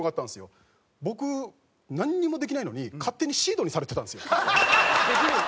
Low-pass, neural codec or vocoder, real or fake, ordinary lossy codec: none; none; real; none